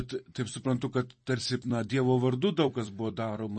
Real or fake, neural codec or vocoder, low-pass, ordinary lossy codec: fake; vocoder, 44.1 kHz, 128 mel bands every 256 samples, BigVGAN v2; 10.8 kHz; MP3, 32 kbps